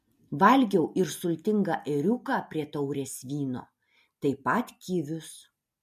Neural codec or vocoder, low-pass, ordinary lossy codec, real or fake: none; 14.4 kHz; MP3, 64 kbps; real